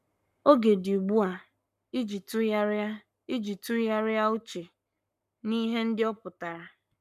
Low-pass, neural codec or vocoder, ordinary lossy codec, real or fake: 14.4 kHz; codec, 44.1 kHz, 7.8 kbps, Pupu-Codec; MP3, 96 kbps; fake